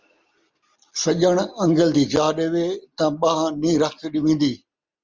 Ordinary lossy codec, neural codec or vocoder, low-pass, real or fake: Opus, 32 kbps; none; 7.2 kHz; real